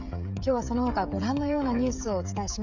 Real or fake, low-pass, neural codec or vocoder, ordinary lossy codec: fake; 7.2 kHz; codec, 16 kHz, 16 kbps, FreqCodec, smaller model; none